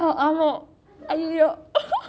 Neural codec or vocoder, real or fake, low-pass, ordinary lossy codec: none; real; none; none